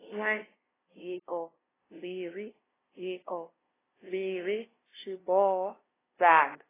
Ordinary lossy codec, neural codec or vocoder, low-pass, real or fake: AAC, 16 kbps; codec, 16 kHz, 0.5 kbps, FunCodec, trained on LibriTTS, 25 frames a second; 3.6 kHz; fake